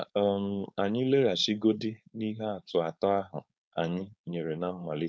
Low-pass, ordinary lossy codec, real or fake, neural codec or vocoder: none; none; fake; codec, 16 kHz, 4.8 kbps, FACodec